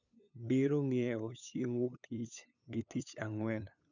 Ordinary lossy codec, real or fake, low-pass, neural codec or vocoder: none; fake; 7.2 kHz; codec, 16 kHz, 8 kbps, FunCodec, trained on LibriTTS, 25 frames a second